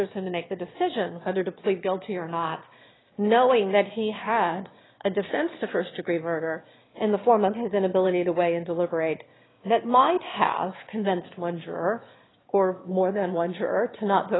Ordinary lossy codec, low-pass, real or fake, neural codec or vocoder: AAC, 16 kbps; 7.2 kHz; fake; autoencoder, 22.05 kHz, a latent of 192 numbers a frame, VITS, trained on one speaker